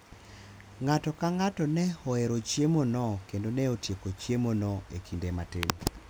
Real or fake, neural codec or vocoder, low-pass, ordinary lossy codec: real; none; none; none